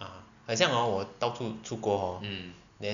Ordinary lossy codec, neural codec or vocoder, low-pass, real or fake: none; none; 7.2 kHz; real